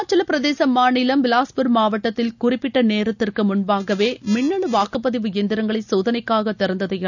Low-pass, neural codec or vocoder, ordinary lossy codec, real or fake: 7.2 kHz; none; none; real